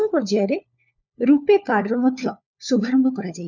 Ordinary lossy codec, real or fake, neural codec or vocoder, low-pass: none; fake; codec, 16 kHz, 4 kbps, FunCodec, trained on LibriTTS, 50 frames a second; 7.2 kHz